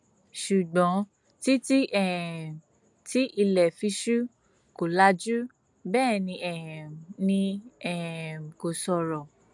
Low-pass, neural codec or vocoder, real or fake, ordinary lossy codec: 10.8 kHz; none; real; none